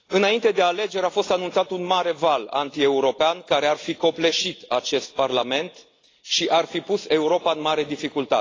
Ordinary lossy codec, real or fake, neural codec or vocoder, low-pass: AAC, 32 kbps; real; none; 7.2 kHz